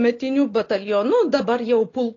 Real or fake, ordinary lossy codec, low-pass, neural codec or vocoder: real; AAC, 32 kbps; 7.2 kHz; none